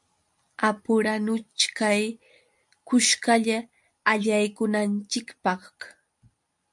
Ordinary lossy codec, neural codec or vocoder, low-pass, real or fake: MP3, 64 kbps; none; 10.8 kHz; real